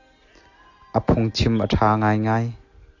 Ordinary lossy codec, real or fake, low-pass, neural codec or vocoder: AAC, 48 kbps; real; 7.2 kHz; none